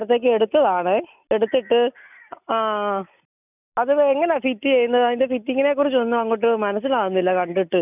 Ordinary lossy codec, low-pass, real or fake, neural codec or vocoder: none; 3.6 kHz; real; none